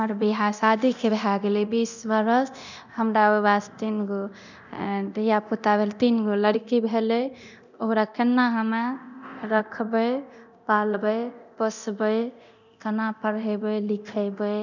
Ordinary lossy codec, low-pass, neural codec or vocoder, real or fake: none; 7.2 kHz; codec, 24 kHz, 0.9 kbps, DualCodec; fake